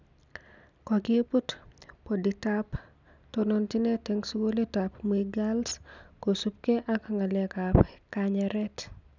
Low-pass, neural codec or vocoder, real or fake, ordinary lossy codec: 7.2 kHz; none; real; none